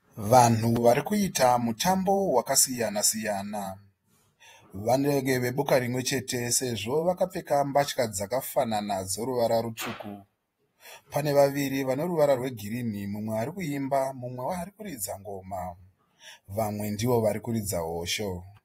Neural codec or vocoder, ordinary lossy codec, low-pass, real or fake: vocoder, 48 kHz, 128 mel bands, Vocos; AAC, 48 kbps; 19.8 kHz; fake